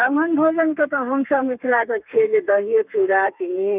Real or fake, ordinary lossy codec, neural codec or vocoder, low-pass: fake; none; codec, 32 kHz, 1.9 kbps, SNAC; 3.6 kHz